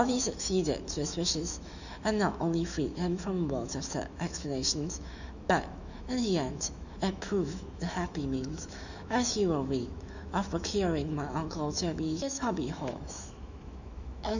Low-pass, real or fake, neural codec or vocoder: 7.2 kHz; fake; autoencoder, 48 kHz, 128 numbers a frame, DAC-VAE, trained on Japanese speech